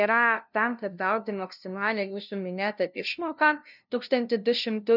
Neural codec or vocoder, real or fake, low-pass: codec, 16 kHz, 0.5 kbps, FunCodec, trained on LibriTTS, 25 frames a second; fake; 5.4 kHz